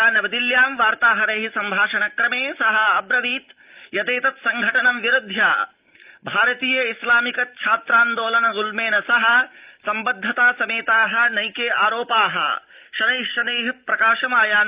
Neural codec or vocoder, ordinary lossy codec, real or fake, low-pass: none; Opus, 24 kbps; real; 3.6 kHz